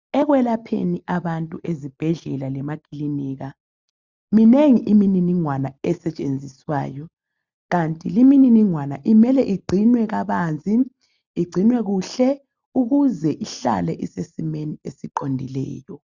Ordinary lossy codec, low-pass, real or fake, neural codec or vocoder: Opus, 64 kbps; 7.2 kHz; real; none